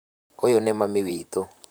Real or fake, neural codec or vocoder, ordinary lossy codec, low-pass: fake; vocoder, 44.1 kHz, 128 mel bands, Pupu-Vocoder; none; none